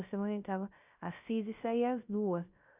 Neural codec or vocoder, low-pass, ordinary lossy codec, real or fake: codec, 16 kHz, 0.3 kbps, FocalCodec; 3.6 kHz; Opus, 64 kbps; fake